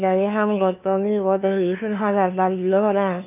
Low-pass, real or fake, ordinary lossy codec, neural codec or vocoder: 3.6 kHz; fake; none; codec, 16 kHz, 2 kbps, FunCodec, trained on LibriTTS, 25 frames a second